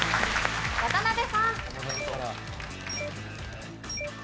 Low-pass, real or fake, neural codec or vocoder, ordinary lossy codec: none; real; none; none